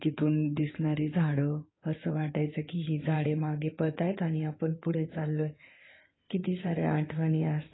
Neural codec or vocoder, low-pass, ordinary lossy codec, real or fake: codec, 16 kHz in and 24 kHz out, 2.2 kbps, FireRedTTS-2 codec; 7.2 kHz; AAC, 16 kbps; fake